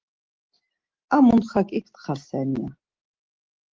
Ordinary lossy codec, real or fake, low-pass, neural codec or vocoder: Opus, 16 kbps; real; 7.2 kHz; none